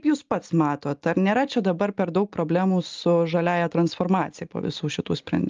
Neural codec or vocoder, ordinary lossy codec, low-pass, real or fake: none; Opus, 32 kbps; 7.2 kHz; real